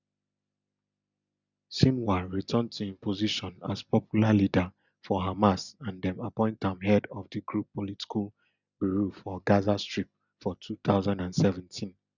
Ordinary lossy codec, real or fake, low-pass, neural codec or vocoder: none; real; 7.2 kHz; none